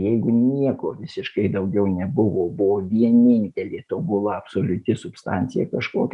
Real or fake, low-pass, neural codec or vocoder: real; 10.8 kHz; none